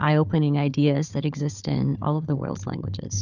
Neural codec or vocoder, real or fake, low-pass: codec, 16 kHz, 4 kbps, FunCodec, trained on Chinese and English, 50 frames a second; fake; 7.2 kHz